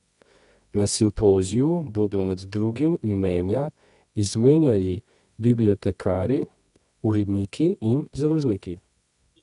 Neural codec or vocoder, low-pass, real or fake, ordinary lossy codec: codec, 24 kHz, 0.9 kbps, WavTokenizer, medium music audio release; 10.8 kHz; fake; none